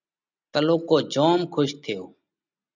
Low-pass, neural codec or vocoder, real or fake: 7.2 kHz; none; real